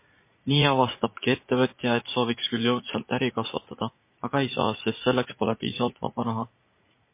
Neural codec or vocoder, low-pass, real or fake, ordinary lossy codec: vocoder, 44.1 kHz, 80 mel bands, Vocos; 3.6 kHz; fake; MP3, 24 kbps